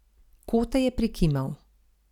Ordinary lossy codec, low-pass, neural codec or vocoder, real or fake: none; 19.8 kHz; none; real